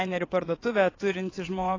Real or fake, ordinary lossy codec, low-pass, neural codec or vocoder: fake; AAC, 32 kbps; 7.2 kHz; vocoder, 22.05 kHz, 80 mel bands, WaveNeXt